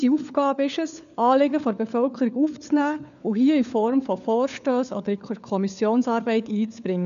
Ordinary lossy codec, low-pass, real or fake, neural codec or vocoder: none; 7.2 kHz; fake; codec, 16 kHz, 4 kbps, FunCodec, trained on LibriTTS, 50 frames a second